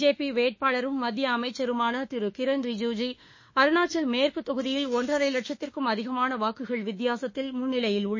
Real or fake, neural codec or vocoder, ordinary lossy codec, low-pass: fake; autoencoder, 48 kHz, 32 numbers a frame, DAC-VAE, trained on Japanese speech; MP3, 32 kbps; 7.2 kHz